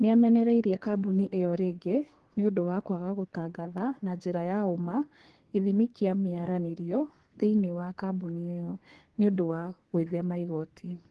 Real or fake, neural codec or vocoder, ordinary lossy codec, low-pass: fake; codec, 32 kHz, 1.9 kbps, SNAC; Opus, 16 kbps; 10.8 kHz